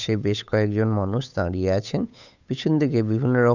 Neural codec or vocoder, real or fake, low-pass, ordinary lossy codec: codec, 16 kHz, 8 kbps, FunCodec, trained on Chinese and English, 25 frames a second; fake; 7.2 kHz; none